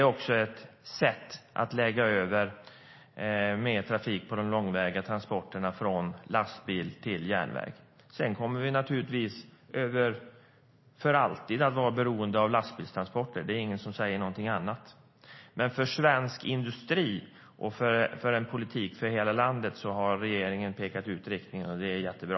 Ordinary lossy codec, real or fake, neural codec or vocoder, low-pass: MP3, 24 kbps; real; none; 7.2 kHz